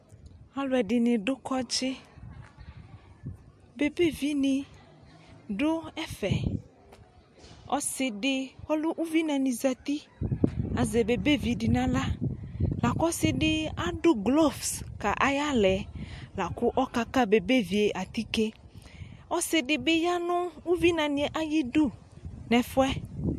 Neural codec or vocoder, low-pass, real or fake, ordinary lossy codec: none; 14.4 kHz; real; MP3, 64 kbps